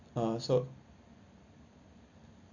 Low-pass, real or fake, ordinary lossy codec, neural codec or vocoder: 7.2 kHz; fake; Opus, 64 kbps; vocoder, 44.1 kHz, 128 mel bands every 256 samples, BigVGAN v2